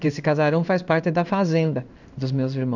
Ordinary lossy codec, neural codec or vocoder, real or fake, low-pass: none; codec, 16 kHz in and 24 kHz out, 1 kbps, XY-Tokenizer; fake; 7.2 kHz